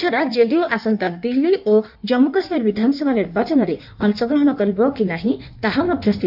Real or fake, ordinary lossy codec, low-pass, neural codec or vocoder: fake; none; 5.4 kHz; codec, 16 kHz in and 24 kHz out, 1.1 kbps, FireRedTTS-2 codec